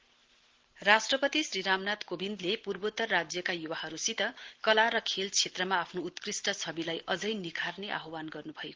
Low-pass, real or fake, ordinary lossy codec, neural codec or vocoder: 7.2 kHz; real; Opus, 16 kbps; none